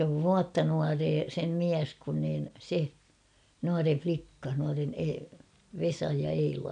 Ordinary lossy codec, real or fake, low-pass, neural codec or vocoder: none; real; 9.9 kHz; none